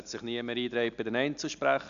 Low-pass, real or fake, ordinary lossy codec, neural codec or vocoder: 7.2 kHz; real; MP3, 96 kbps; none